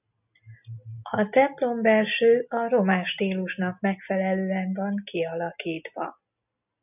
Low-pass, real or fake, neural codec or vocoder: 3.6 kHz; real; none